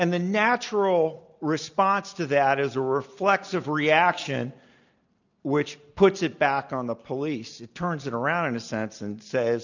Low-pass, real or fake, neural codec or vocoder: 7.2 kHz; real; none